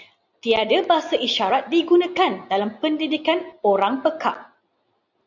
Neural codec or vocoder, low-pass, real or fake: none; 7.2 kHz; real